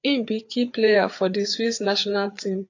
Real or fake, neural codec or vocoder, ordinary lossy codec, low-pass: fake; codec, 16 kHz, 4 kbps, FreqCodec, larger model; AAC, 48 kbps; 7.2 kHz